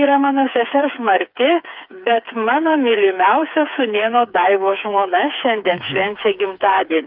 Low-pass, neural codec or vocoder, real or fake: 5.4 kHz; codec, 16 kHz, 4 kbps, FreqCodec, smaller model; fake